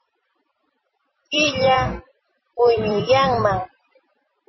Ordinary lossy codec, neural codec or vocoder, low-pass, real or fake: MP3, 24 kbps; vocoder, 44.1 kHz, 128 mel bands every 256 samples, BigVGAN v2; 7.2 kHz; fake